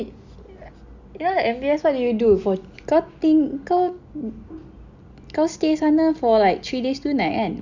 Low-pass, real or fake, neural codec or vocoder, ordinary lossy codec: 7.2 kHz; real; none; Opus, 64 kbps